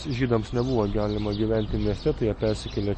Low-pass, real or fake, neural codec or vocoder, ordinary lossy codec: 9.9 kHz; real; none; MP3, 32 kbps